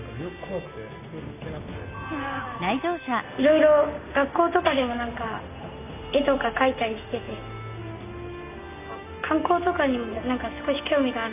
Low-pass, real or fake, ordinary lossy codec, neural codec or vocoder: 3.6 kHz; real; none; none